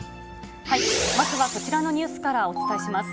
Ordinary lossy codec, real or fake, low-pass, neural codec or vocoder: none; real; none; none